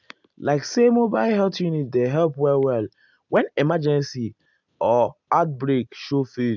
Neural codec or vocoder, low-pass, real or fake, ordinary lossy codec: none; 7.2 kHz; real; none